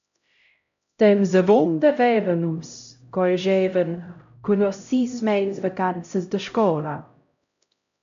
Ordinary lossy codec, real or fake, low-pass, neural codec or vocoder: AAC, 96 kbps; fake; 7.2 kHz; codec, 16 kHz, 0.5 kbps, X-Codec, HuBERT features, trained on LibriSpeech